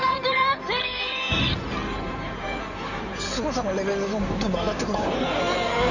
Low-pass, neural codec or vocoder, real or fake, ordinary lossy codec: 7.2 kHz; codec, 16 kHz in and 24 kHz out, 2.2 kbps, FireRedTTS-2 codec; fake; none